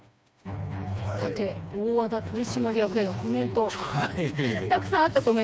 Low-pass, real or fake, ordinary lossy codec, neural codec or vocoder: none; fake; none; codec, 16 kHz, 2 kbps, FreqCodec, smaller model